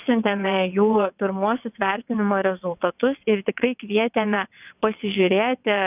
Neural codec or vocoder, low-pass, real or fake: vocoder, 22.05 kHz, 80 mel bands, WaveNeXt; 3.6 kHz; fake